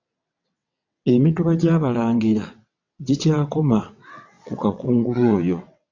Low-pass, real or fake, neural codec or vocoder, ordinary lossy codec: 7.2 kHz; fake; vocoder, 22.05 kHz, 80 mel bands, WaveNeXt; AAC, 48 kbps